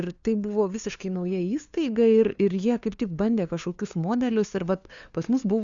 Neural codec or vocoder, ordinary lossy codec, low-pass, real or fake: codec, 16 kHz, 2 kbps, FunCodec, trained on LibriTTS, 25 frames a second; Opus, 64 kbps; 7.2 kHz; fake